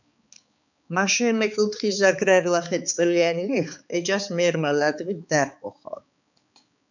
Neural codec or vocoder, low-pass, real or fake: codec, 16 kHz, 4 kbps, X-Codec, HuBERT features, trained on balanced general audio; 7.2 kHz; fake